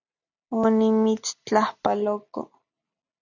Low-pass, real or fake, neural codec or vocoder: 7.2 kHz; real; none